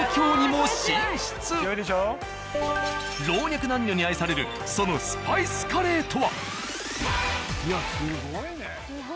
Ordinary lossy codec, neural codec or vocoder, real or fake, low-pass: none; none; real; none